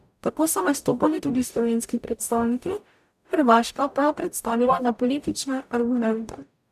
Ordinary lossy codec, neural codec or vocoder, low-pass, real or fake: none; codec, 44.1 kHz, 0.9 kbps, DAC; 14.4 kHz; fake